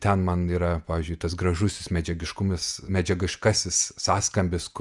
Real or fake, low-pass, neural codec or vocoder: fake; 10.8 kHz; vocoder, 48 kHz, 128 mel bands, Vocos